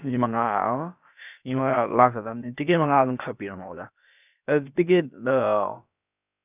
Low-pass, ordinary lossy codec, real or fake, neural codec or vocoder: 3.6 kHz; none; fake; codec, 16 kHz, about 1 kbps, DyCAST, with the encoder's durations